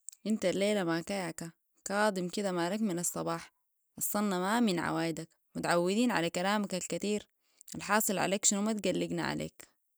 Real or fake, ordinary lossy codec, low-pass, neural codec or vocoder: real; none; none; none